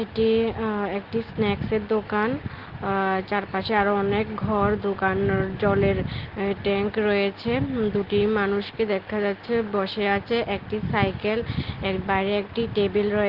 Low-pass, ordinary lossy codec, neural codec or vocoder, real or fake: 5.4 kHz; Opus, 16 kbps; none; real